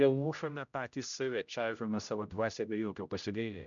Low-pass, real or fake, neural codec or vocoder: 7.2 kHz; fake; codec, 16 kHz, 0.5 kbps, X-Codec, HuBERT features, trained on general audio